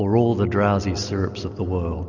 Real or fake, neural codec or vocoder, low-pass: real; none; 7.2 kHz